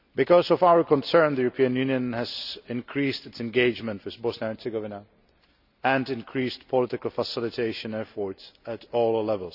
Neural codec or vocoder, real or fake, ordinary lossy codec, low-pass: none; real; none; 5.4 kHz